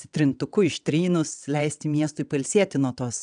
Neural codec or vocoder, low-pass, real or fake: vocoder, 22.05 kHz, 80 mel bands, WaveNeXt; 9.9 kHz; fake